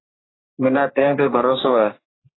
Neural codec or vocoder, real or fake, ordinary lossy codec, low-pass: codec, 44.1 kHz, 3.4 kbps, Pupu-Codec; fake; AAC, 16 kbps; 7.2 kHz